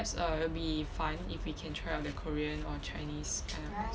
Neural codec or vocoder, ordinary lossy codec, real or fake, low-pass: none; none; real; none